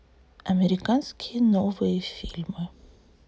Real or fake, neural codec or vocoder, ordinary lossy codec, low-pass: real; none; none; none